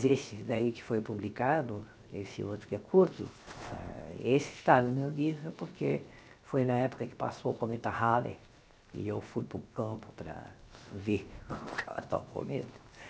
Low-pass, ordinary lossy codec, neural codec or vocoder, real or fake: none; none; codec, 16 kHz, 0.7 kbps, FocalCodec; fake